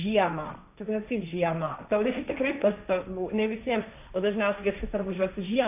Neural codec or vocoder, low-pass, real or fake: codec, 16 kHz, 1.1 kbps, Voila-Tokenizer; 3.6 kHz; fake